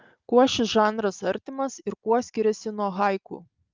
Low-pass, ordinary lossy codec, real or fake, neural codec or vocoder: 7.2 kHz; Opus, 24 kbps; real; none